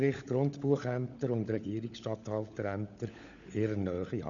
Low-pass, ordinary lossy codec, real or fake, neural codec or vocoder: 7.2 kHz; none; fake; codec, 16 kHz, 16 kbps, FunCodec, trained on LibriTTS, 50 frames a second